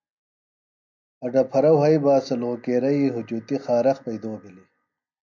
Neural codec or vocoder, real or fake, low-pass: none; real; 7.2 kHz